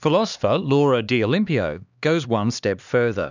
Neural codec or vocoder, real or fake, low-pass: codec, 16 kHz, 2 kbps, X-Codec, HuBERT features, trained on LibriSpeech; fake; 7.2 kHz